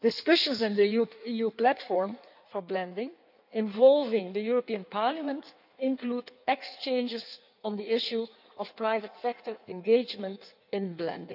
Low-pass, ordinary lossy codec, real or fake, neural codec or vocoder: 5.4 kHz; none; fake; codec, 16 kHz in and 24 kHz out, 1.1 kbps, FireRedTTS-2 codec